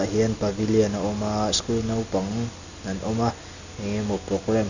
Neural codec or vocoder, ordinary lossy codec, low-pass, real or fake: none; none; 7.2 kHz; real